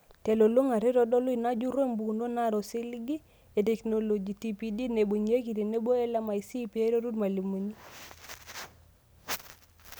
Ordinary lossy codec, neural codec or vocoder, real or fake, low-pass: none; none; real; none